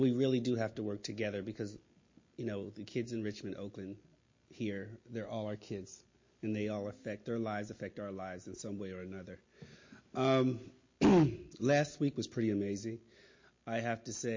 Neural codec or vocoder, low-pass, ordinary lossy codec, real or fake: none; 7.2 kHz; MP3, 32 kbps; real